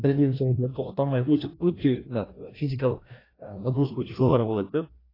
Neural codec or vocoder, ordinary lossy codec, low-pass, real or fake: codec, 16 kHz, 1 kbps, FreqCodec, larger model; AAC, 24 kbps; 5.4 kHz; fake